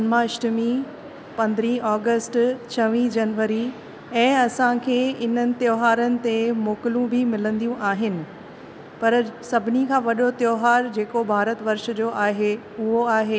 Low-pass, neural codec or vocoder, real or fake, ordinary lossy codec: none; none; real; none